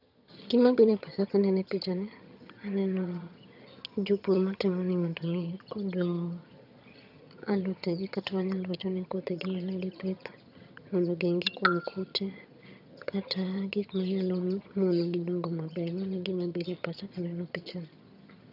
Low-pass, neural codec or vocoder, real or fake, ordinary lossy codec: 5.4 kHz; vocoder, 22.05 kHz, 80 mel bands, HiFi-GAN; fake; none